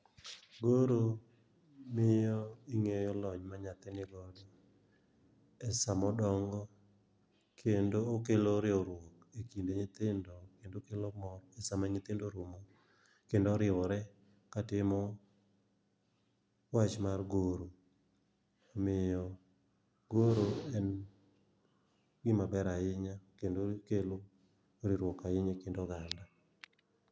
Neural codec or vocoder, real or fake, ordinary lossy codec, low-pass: none; real; none; none